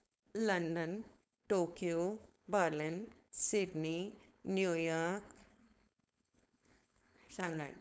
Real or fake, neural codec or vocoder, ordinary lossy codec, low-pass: fake; codec, 16 kHz, 4.8 kbps, FACodec; none; none